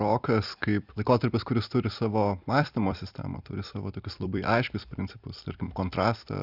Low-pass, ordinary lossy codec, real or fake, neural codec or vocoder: 5.4 kHz; Opus, 64 kbps; fake; vocoder, 44.1 kHz, 128 mel bands every 256 samples, BigVGAN v2